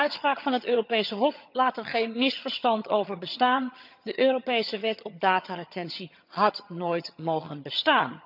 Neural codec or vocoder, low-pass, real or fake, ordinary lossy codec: vocoder, 22.05 kHz, 80 mel bands, HiFi-GAN; 5.4 kHz; fake; none